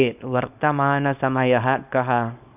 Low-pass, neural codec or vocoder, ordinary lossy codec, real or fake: 3.6 kHz; codec, 24 kHz, 0.9 kbps, WavTokenizer, small release; none; fake